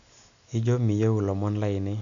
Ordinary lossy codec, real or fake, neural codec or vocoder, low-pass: none; real; none; 7.2 kHz